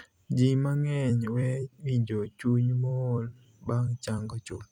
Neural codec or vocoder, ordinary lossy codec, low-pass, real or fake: vocoder, 44.1 kHz, 128 mel bands every 256 samples, BigVGAN v2; none; 19.8 kHz; fake